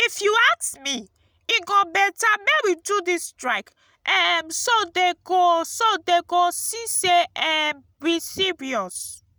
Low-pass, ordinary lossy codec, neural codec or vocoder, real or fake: none; none; none; real